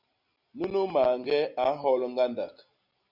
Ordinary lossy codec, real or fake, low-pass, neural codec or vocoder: Opus, 64 kbps; real; 5.4 kHz; none